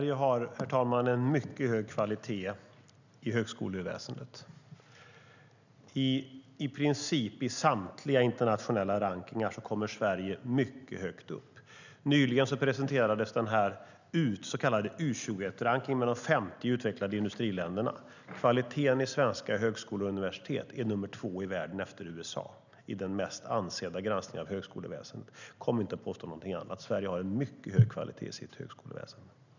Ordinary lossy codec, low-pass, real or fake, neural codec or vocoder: none; 7.2 kHz; real; none